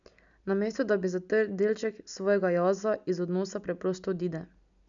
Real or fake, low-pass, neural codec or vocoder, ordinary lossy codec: real; 7.2 kHz; none; none